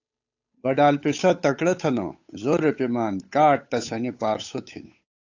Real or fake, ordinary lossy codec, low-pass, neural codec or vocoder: fake; AAC, 48 kbps; 7.2 kHz; codec, 16 kHz, 8 kbps, FunCodec, trained on Chinese and English, 25 frames a second